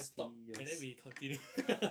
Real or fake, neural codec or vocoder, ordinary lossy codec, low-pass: fake; codec, 44.1 kHz, 7.8 kbps, DAC; none; none